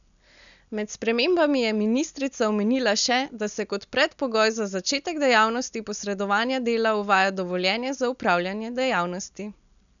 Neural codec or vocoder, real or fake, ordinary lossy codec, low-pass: none; real; none; 7.2 kHz